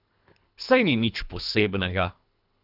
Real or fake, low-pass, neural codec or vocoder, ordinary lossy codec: fake; 5.4 kHz; codec, 24 kHz, 3 kbps, HILCodec; none